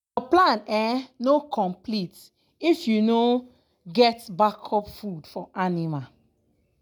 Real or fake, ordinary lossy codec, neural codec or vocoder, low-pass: real; none; none; none